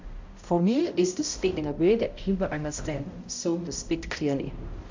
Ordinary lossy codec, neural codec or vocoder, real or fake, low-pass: MP3, 64 kbps; codec, 16 kHz, 0.5 kbps, X-Codec, HuBERT features, trained on balanced general audio; fake; 7.2 kHz